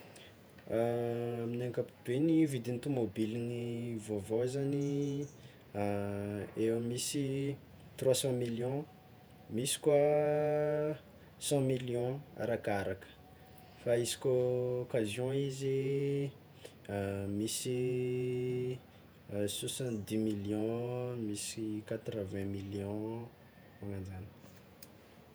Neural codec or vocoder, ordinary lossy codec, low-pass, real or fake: vocoder, 48 kHz, 128 mel bands, Vocos; none; none; fake